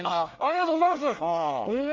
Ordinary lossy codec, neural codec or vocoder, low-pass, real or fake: Opus, 32 kbps; codec, 24 kHz, 1 kbps, SNAC; 7.2 kHz; fake